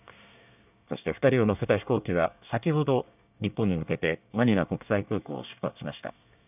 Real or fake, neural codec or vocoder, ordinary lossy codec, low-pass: fake; codec, 24 kHz, 1 kbps, SNAC; none; 3.6 kHz